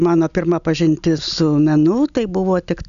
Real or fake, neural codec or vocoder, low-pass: real; none; 7.2 kHz